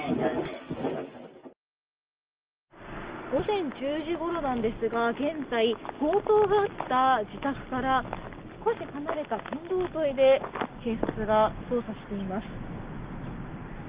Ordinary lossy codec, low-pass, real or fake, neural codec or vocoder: Opus, 24 kbps; 3.6 kHz; real; none